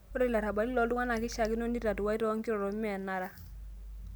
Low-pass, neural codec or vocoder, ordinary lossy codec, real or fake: none; none; none; real